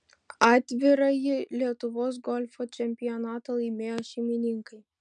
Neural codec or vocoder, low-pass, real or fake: none; 9.9 kHz; real